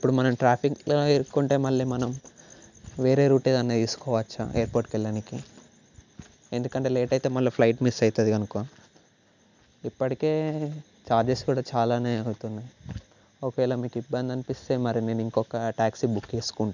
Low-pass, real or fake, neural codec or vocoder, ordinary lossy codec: 7.2 kHz; real; none; none